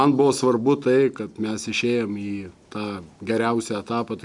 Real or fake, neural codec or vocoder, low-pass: real; none; 10.8 kHz